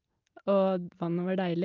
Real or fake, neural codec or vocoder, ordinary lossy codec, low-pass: real; none; Opus, 24 kbps; 7.2 kHz